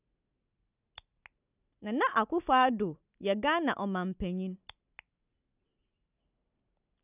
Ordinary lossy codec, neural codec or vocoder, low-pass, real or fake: none; none; 3.6 kHz; real